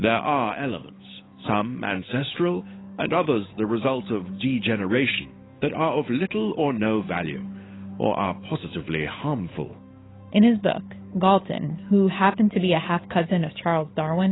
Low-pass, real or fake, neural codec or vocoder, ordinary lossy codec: 7.2 kHz; fake; codec, 16 kHz, 8 kbps, FunCodec, trained on Chinese and English, 25 frames a second; AAC, 16 kbps